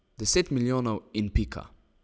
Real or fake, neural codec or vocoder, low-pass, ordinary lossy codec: real; none; none; none